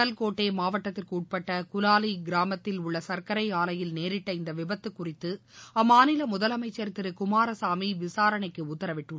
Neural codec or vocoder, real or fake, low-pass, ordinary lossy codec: none; real; none; none